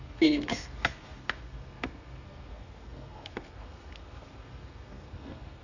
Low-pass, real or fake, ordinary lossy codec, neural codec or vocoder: 7.2 kHz; fake; none; codec, 32 kHz, 1.9 kbps, SNAC